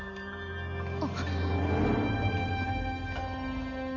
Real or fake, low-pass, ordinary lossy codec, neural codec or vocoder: real; 7.2 kHz; none; none